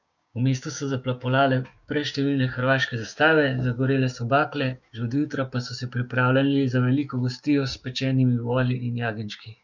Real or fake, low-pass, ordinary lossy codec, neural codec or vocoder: fake; 7.2 kHz; none; codec, 16 kHz, 6 kbps, DAC